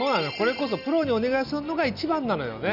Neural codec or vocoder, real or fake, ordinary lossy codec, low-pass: none; real; none; 5.4 kHz